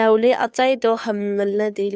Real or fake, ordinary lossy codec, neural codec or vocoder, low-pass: fake; none; codec, 16 kHz, 2 kbps, FunCodec, trained on Chinese and English, 25 frames a second; none